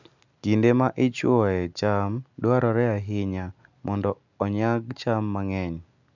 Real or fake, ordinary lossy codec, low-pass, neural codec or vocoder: real; none; 7.2 kHz; none